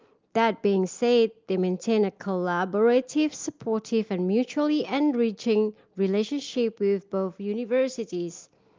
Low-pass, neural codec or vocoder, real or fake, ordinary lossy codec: 7.2 kHz; none; real; Opus, 32 kbps